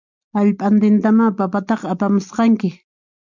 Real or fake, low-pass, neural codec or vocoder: real; 7.2 kHz; none